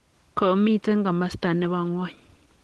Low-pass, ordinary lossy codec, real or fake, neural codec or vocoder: 10.8 kHz; Opus, 16 kbps; real; none